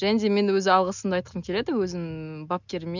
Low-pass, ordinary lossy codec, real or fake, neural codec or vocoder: 7.2 kHz; none; real; none